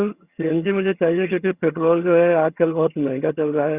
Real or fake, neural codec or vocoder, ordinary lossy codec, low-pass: fake; vocoder, 22.05 kHz, 80 mel bands, HiFi-GAN; Opus, 64 kbps; 3.6 kHz